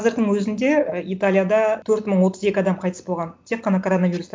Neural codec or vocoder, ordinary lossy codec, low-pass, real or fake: none; none; none; real